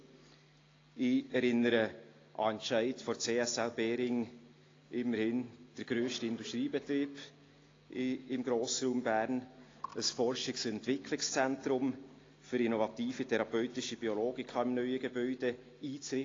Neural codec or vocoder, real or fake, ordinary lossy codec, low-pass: none; real; AAC, 32 kbps; 7.2 kHz